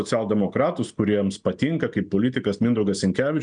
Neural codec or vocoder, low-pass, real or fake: none; 9.9 kHz; real